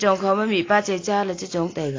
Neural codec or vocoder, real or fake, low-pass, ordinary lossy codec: none; real; 7.2 kHz; AAC, 32 kbps